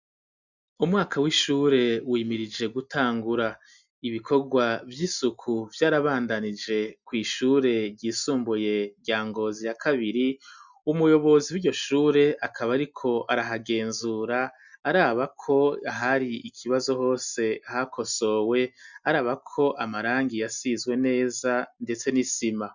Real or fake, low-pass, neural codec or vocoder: real; 7.2 kHz; none